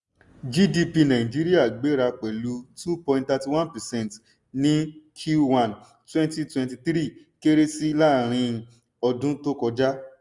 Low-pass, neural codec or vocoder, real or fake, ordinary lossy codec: 10.8 kHz; none; real; none